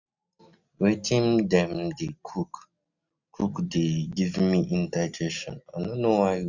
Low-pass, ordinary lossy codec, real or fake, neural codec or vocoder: 7.2 kHz; none; real; none